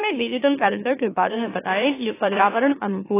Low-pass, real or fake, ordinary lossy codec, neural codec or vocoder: 3.6 kHz; fake; AAC, 16 kbps; autoencoder, 44.1 kHz, a latent of 192 numbers a frame, MeloTTS